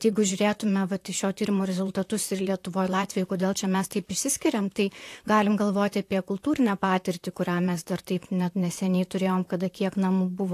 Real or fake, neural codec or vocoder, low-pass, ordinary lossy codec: fake; vocoder, 44.1 kHz, 128 mel bands, Pupu-Vocoder; 14.4 kHz; AAC, 64 kbps